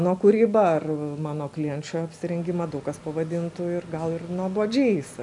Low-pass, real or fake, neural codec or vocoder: 10.8 kHz; real; none